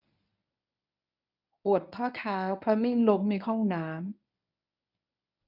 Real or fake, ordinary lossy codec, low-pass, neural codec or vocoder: fake; none; 5.4 kHz; codec, 24 kHz, 0.9 kbps, WavTokenizer, medium speech release version 1